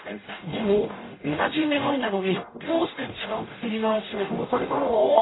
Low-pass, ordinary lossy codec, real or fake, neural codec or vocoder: 7.2 kHz; AAC, 16 kbps; fake; codec, 44.1 kHz, 0.9 kbps, DAC